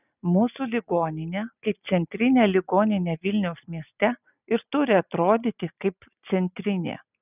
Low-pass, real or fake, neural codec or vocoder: 3.6 kHz; real; none